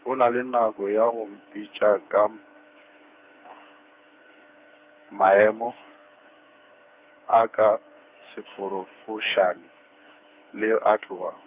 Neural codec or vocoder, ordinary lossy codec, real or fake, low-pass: codec, 16 kHz, 4 kbps, FreqCodec, smaller model; Opus, 64 kbps; fake; 3.6 kHz